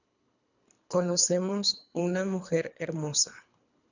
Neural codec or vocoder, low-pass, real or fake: codec, 24 kHz, 3 kbps, HILCodec; 7.2 kHz; fake